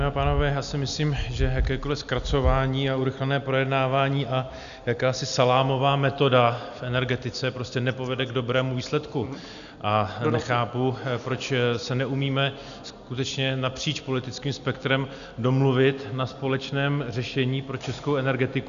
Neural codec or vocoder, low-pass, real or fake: none; 7.2 kHz; real